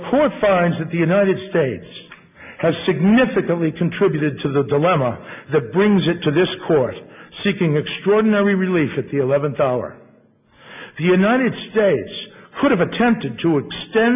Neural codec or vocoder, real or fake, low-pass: none; real; 3.6 kHz